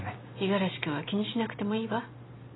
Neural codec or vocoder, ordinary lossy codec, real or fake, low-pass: none; AAC, 16 kbps; real; 7.2 kHz